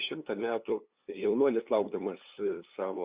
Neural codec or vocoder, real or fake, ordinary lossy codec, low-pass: codec, 16 kHz, 2 kbps, FunCodec, trained on LibriTTS, 25 frames a second; fake; Opus, 64 kbps; 3.6 kHz